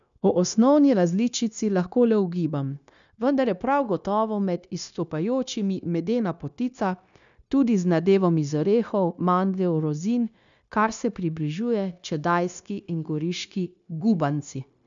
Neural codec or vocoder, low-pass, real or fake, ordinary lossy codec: codec, 16 kHz, 0.9 kbps, LongCat-Audio-Codec; 7.2 kHz; fake; none